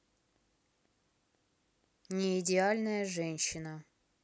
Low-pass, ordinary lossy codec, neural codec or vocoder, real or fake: none; none; none; real